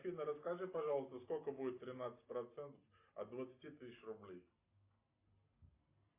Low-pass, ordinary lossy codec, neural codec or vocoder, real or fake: 3.6 kHz; AAC, 32 kbps; none; real